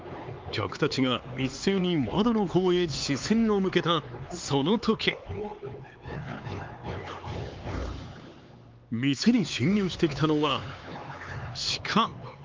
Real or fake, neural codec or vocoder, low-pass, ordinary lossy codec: fake; codec, 16 kHz, 4 kbps, X-Codec, HuBERT features, trained on LibriSpeech; 7.2 kHz; Opus, 32 kbps